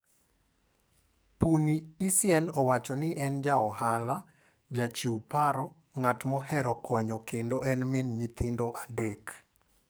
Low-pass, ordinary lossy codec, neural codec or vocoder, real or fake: none; none; codec, 44.1 kHz, 2.6 kbps, SNAC; fake